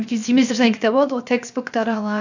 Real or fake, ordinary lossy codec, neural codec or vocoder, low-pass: fake; none; codec, 16 kHz, 0.7 kbps, FocalCodec; 7.2 kHz